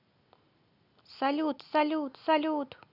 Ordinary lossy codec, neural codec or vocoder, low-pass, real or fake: none; none; 5.4 kHz; real